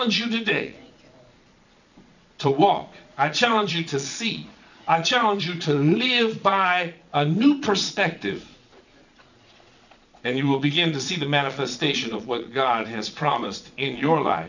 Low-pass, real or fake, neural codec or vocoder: 7.2 kHz; fake; vocoder, 22.05 kHz, 80 mel bands, Vocos